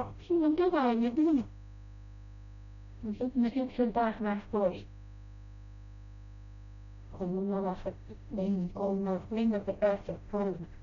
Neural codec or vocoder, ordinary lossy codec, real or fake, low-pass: codec, 16 kHz, 0.5 kbps, FreqCodec, smaller model; none; fake; 7.2 kHz